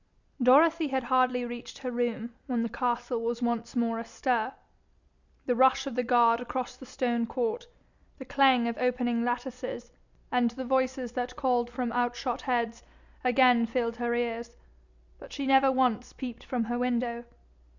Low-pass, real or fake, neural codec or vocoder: 7.2 kHz; real; none